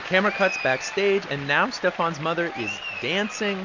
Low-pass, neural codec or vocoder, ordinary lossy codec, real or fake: 7.2 kHz; none; MP3, 48 kbps; real